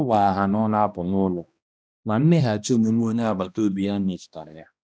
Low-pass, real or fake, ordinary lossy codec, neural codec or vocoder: none; fake; none; codec, 16 kHz, 1 kbps, X-Codec, HuBERT features, trained on balanced general audio